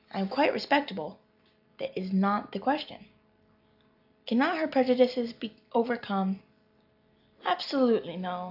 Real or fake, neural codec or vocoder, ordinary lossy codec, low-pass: real; none; AAC, 48 kbps; 5.4 kHz